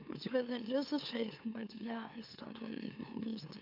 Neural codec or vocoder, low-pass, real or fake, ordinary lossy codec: autoencoder, 44.1 kHz, a latent of 192 numbers a frame, MeloTTS; 5.4 kHz; fake; MP3, 48 kbps